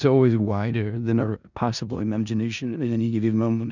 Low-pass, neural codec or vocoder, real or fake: 7.2 kHz; codec, 16 kHz in and 24 kHz out, 0.4 kbps, LongCat-Audio-Codec, four codebook decoder; fake